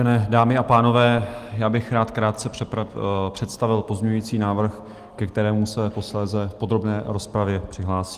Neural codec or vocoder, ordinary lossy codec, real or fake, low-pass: none; Opus, 24 kbps; real; 14.4 kHz